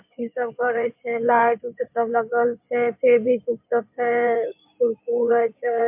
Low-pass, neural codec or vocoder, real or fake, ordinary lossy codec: 3.6 kHz; codec, 16 kHz in and 24 kHz out, 2.2 kbps, FireRedTTS-2 codec; fake; MP3, 32 kbps